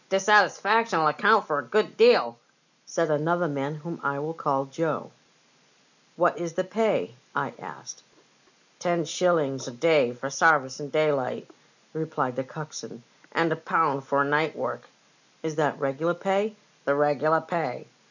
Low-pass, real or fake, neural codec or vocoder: 7.2 kHz; real; none